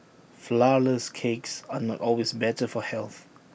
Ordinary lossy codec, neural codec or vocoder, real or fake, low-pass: none; none; real; none